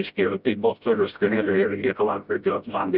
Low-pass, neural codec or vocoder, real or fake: 5.4 kHz; codec, 16 kHz, 0.5 kbps, FreqCodec, smaller model; fake